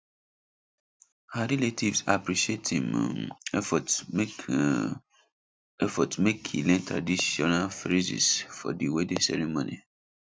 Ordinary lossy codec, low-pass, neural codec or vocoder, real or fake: none; none; none; real